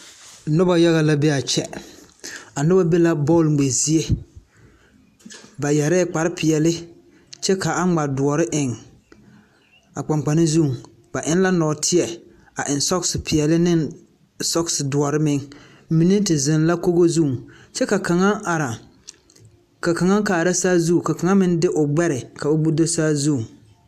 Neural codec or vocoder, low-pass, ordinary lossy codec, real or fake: none; 14.4 kHz; AAC, 96 kbps; real